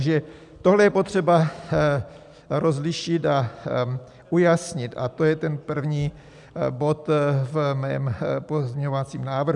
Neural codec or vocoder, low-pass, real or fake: none; 10.8 kHz; real